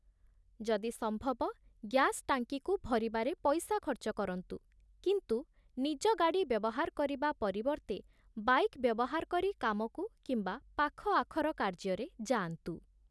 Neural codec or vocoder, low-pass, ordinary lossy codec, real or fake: none; none; none; real